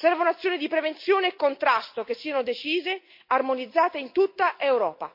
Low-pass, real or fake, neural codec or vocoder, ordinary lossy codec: 5.4 kHz; real; none; MP3, 32 kbps